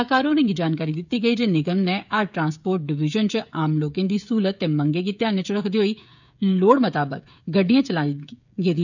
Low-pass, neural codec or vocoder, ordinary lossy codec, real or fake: 7.2 kHz; codec, 16 kHz, 16 kbps, FreqCodec, smaller model; none; fake